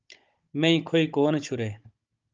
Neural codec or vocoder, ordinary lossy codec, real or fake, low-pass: codec, 16 kHz, 16 kbps, FunCodec, trained on Chinese and English, 50 frames a second; Opus, 24 kbps; fake; 7.2 kHz